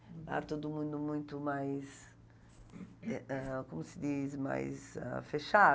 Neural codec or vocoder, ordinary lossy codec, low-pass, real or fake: none; none; none; real